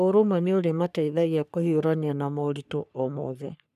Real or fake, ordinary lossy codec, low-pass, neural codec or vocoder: fake; none; 14.4 kHz; codec, 44.1 kHz, 3.4 kbps, Pupu-Codec